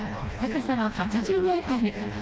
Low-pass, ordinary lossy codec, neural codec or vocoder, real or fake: none; none; codec, 16 kHz, 1 kbps, FreqCodec, smaller model; fake